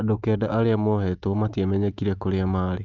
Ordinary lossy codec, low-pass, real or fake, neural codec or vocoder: Opus, 24 kbps; 7.2 kHz; real; none